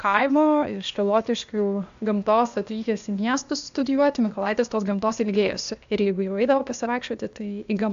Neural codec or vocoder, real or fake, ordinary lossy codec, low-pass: codec, 16 kHz, 0.8 kbps, ZipCodec; fake; AAC, 64 kbps; 7.2 kHz